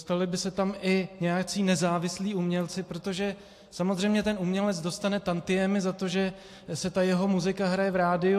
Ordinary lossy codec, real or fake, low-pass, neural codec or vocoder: AAC, 64 kbps; real; 14.4 kHz; none